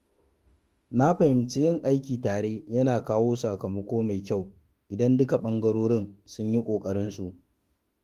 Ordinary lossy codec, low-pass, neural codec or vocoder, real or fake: Opus, 24 kbps; 14.4 kHz; autoencoder, 48 kHz, 32 numbers a frame, DAC-VAE, trained on Japanese speech; fake